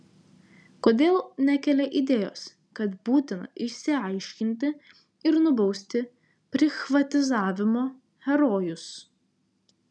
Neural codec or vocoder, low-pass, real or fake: none; 9.9 kHz; real